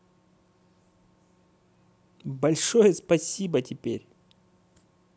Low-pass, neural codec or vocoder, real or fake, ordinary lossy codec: none; none; real; none